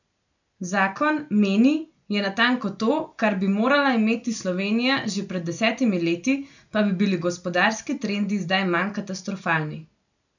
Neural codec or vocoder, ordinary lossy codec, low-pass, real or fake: none; none; 7.2 kHz; real